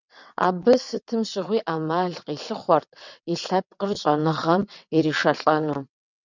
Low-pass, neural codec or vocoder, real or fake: 7.2 kHz; vocoder, 22.05 kHz, 80 mel bands, WaveNeXt; fake